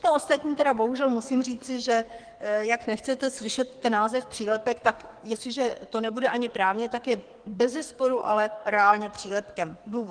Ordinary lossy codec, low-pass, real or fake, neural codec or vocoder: Opus, 24 kbps; 9.9 kHz; fake; codec, 44.1 kHz, 2.6 kbps, SNAC